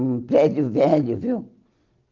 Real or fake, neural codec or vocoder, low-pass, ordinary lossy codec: real; none; 7.2 kHz; Opus, 32 kbps